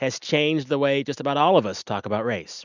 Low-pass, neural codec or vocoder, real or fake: 7.2 kHz; none; real